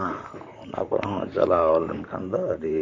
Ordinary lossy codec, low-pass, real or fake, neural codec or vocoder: AAC, 48 kbps; 7.2 kHz; fake; vocoder, 44.1 kHz, 128 mel bands, Pupu-Vocoder